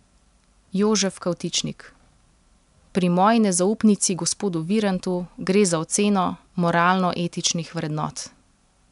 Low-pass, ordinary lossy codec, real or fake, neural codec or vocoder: 10.8 kHz; MP3, 96 kbps; real; none